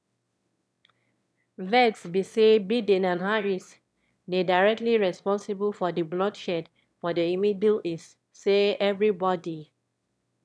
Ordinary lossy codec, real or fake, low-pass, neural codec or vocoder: none; fake; none; autoencoder, 22.05 kHz, a latent of 192 numbers a frame, VITS, trained on one speaker